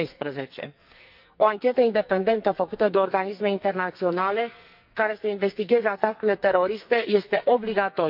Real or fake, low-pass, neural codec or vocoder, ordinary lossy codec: fake; 5.4 kHz; codec, 44.1 kHz, 2.6 kbps, SNAC; none